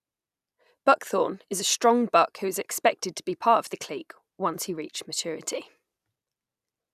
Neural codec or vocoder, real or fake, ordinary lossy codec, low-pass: none; real; none; 14.4 kHz